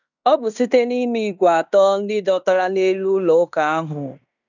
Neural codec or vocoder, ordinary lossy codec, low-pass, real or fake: codec, 16 kHz in and 24 kHz out, 0.9 kbps, LongCat-Audio-Codec, fine tuned four codebook decoder; none; 7.2 kHz; fake